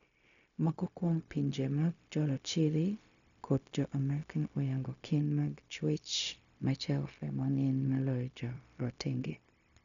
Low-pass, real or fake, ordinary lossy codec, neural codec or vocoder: 7.2 kHz; fake; none; codec, 16 kHz, 0.4 kbps, LongCat-Audio-Codec